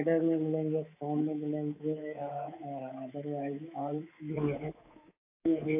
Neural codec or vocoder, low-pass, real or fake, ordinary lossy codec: codec, 24 kHz, 3.1 kbps, DualCodec; 3.6 kHz; fake; AAC, 24 kbps